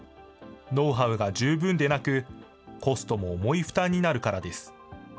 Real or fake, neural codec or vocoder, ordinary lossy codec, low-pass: real; none; none; none